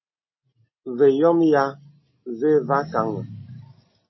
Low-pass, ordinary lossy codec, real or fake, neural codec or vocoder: 7.2 kHz; MP3, 24 kbps; real; none